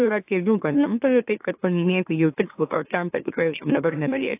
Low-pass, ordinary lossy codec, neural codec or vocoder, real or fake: 3.6 kHz; AAC, 24 kbps; autoencoder, 44.1 kHz, a latent of 192 numbers a frame, MeloTTS; fake